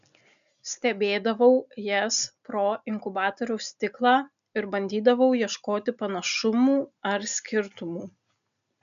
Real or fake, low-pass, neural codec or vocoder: real; 7.2 kHz; none